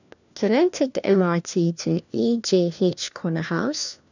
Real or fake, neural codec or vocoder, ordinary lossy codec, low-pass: fake; codec, 16 kHz, 1 kbps, FunCodec, trained on LibriTTS, 50 frames a second; none; 7.2 kHz